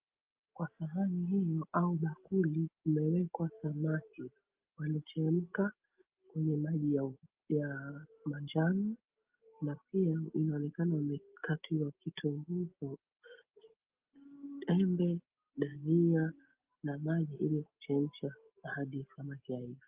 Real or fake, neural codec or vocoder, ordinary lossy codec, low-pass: real; none; Opus, 32 kbps; 3.6 kHz